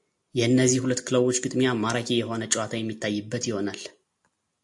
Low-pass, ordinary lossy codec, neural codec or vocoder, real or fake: 10.8 kHz; AAC, 64 kbps; none; real